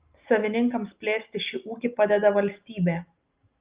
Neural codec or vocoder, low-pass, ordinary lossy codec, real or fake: none; 3.6 kHz; Opus, 32 kbps; real